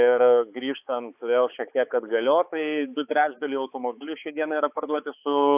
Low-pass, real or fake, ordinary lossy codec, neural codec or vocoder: 3.6 kHz; fake; AAC, 32 kbps; codec, 16 kHz, 4 kbps, X-Codec, HuBERT features, trained on balanced general audio